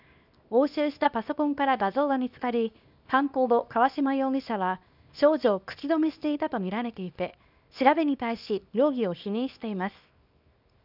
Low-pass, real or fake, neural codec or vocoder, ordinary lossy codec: 5.4 kHz; fake; codec, 24 kHz, 0.9 kbps, WavTokenizer, small release; none